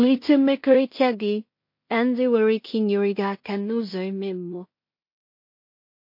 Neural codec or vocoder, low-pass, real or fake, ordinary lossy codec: codec, 16 kHz in and 24 kHz out, 0.4 kbps, LongCat-Audio-Codec, two codebook decoder; 5.4 kHz; fake; MP3, 32 kbps